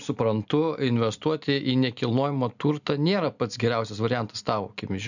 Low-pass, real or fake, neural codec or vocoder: 7.2 kHz; real; none